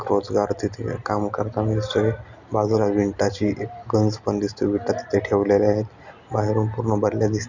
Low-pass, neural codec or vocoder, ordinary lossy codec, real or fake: 7.2 kHz; none; none; real